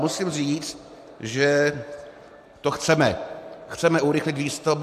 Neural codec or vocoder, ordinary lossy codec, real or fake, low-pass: none; AAC, 64 kbps; real; 14.4 kHz